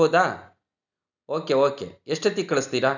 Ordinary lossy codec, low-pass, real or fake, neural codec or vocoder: none; 7.2 kHz; real; none